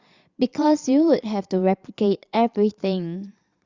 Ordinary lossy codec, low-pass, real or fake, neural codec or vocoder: Opus, 64 kbps; 7.2 kHz; fake; vocoder, 22.05 kHz, 80 mel bands, Vocos